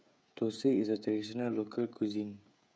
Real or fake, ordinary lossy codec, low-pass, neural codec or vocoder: fake; none; none; codec, 16 kHz, 16 kbps, FreqCodec, smaller model